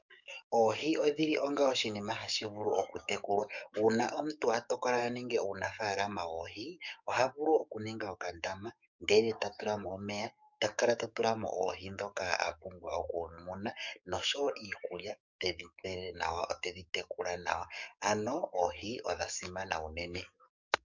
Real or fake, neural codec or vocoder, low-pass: fake; codec, 44.1 kHz, 7.8 kbps, DAC; 7.2 kHz